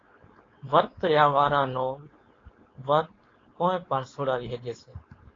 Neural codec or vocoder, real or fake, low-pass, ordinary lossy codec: codec, 16 kHz, 4.8 kbps, FACodec; fake; 7.2 kHz; AAC, 32 kbps